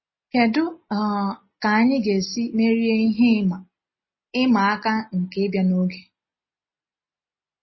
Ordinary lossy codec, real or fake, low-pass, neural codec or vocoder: MP3, 24 kbps; real; 7.2 kHz; none